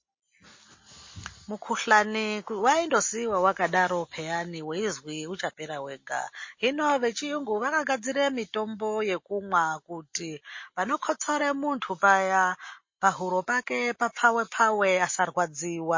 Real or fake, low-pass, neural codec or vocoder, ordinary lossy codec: real; 7.2 kHz; none; MP3, 32 kbps